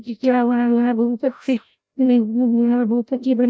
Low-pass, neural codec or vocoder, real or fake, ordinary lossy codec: none; codec, 16 kHz, 0.5 kbps, FreqCodec, larger model; fake; none